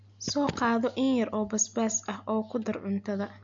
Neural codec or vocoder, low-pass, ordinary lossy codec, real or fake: none; 7.2 kHz; MP3, 48 kbps; real